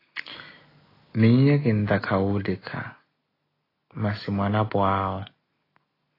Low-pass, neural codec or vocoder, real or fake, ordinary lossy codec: 5.4 kHz; none; real; AAC, 24 kbps